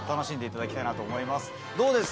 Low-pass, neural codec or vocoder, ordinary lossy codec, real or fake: none; none; none; real